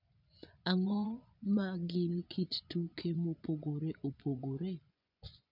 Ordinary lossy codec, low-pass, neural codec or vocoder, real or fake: none; 5.4 kHz; vocoder, 24 kHz, 100 mel bands, Vocos; fake